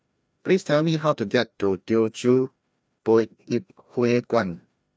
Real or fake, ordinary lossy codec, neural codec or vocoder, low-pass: fake; none; codec, 16 kHz, 1 kbps, FreqCodec, larger model; none